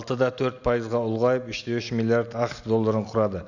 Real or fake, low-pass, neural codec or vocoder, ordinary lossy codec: real; 7.2 kHz; none; none